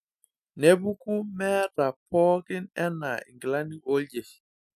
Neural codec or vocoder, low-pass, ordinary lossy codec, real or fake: none; 14.4 kHz; none; real